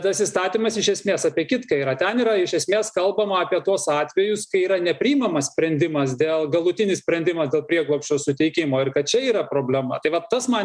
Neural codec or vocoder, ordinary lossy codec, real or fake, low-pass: none; MP3, 96 kbps; real; 9.9 kHz